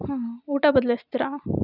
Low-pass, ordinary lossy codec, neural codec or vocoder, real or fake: 5.4 kHz; none; none; real